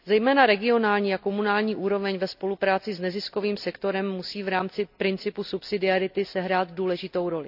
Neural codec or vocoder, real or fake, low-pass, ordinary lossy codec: none; real; 5.4 kHz; AAC, 48 kbps